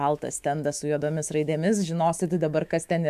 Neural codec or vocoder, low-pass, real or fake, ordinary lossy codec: autoencoder, 48 kHz, 128 numbers a frame, DAC-VAE, trained on Japanese speech; 14.4 kHz; fake; MP3, 96 kbps